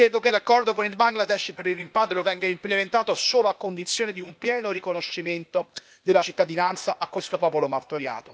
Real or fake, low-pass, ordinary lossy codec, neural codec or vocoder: fake; none; none; codec, 16 kHz, 0.8 kbps, ZipCodec